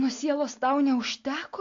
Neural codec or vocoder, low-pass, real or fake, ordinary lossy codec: none; 7.2 kHz; real; AAC, 48 kbps